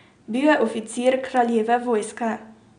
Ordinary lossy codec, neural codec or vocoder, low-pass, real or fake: none; none; 9.9 kHz; real